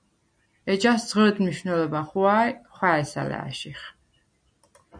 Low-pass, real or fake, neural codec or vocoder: 9.9 kHz; real; none